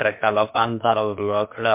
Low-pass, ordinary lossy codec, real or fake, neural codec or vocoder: 3.6 kHz; MP3, 32 kbps; fake; codec, 16 kHz, about 1 kbps, DyCAST, with the encoder's durations